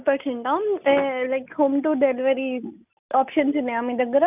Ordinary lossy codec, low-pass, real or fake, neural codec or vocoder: none; 3.6 kHz; real; none